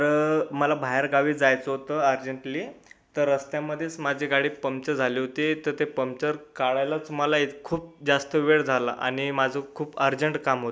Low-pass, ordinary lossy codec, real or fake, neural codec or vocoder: none; none; real; none